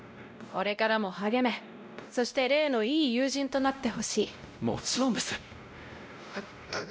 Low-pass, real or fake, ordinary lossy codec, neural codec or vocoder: none; fake; none; codec, 16 kHz, 0.5 kbps, X-Codec, WavLM features, trained on Multilingual LibriSpeech